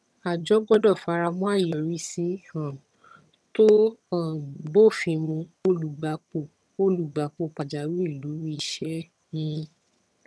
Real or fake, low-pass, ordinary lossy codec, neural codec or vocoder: fake; none; none; vocoder, 22.05 kHz, 80 mel bands, HiFi-GAN